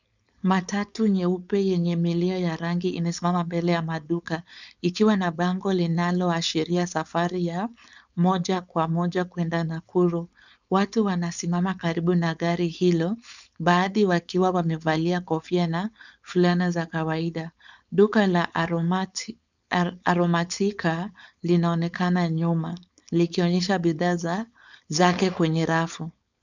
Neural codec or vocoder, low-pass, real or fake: codec, 16 kHz, 4.8 kbps, FACodec; 7.2 kHz; fake